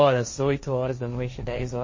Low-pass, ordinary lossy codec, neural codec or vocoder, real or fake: 7.2 kHz; MP3, 32 kbps; codec, 16 kHz, 1.1 kbps, Voila-Tokenizer; fake